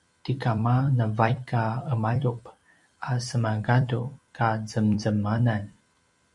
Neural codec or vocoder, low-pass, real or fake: vocoder, 44.1 kHz, 128 mel bands every 256 samples, BigVGAN v2; 10.8 kHz; fake